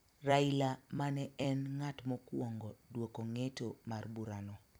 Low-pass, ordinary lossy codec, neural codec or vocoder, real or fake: none; none; vocoder, 44.1 kHz, 128 mel bands every 256 samples, BigVGAN v2; fake